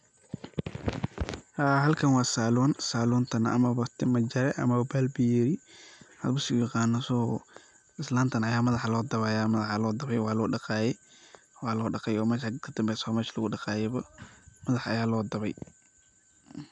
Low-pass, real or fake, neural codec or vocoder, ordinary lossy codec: 10.8 kHz; real; none; none